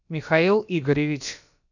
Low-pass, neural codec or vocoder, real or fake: 7.2 kHz; codec, 16 kHz, about 1 kbps, DyCAST, with the encoder's durations; fake